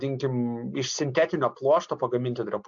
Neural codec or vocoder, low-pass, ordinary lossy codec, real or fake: none; 7.2 kHz; AAC, 64 kbps; real